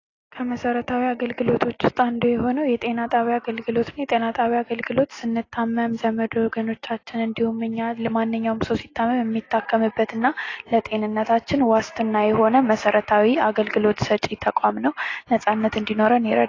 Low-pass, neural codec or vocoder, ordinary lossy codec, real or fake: 7.2 kHz; none; AAC, 32 kbps; real